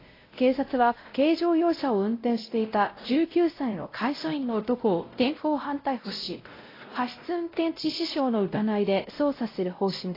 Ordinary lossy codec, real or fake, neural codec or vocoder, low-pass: AAC, 24 kbps; fake; codec, 16 kHz, 0.5 kbps, X-Codec, WavLM features, trained on Multilingual LibriSpeech; 5.4 kHz